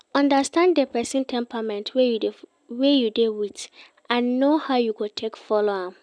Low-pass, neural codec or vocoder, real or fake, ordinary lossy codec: 9.9 kHz; none; real; none